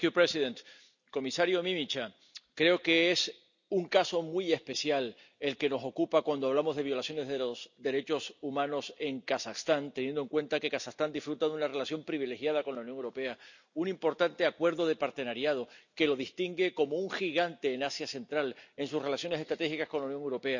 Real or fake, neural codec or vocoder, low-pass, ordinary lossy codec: real; none; 7.2 kHz; none